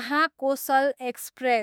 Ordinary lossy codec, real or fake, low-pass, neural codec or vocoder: none; fake; none; autoencoder, 48 kHz, 32 numbers a frame, DAC-VAE, trained on Japanese speech